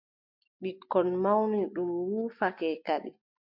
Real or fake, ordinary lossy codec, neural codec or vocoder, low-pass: real; MP3, 48 kbps; none; 5.4 kHz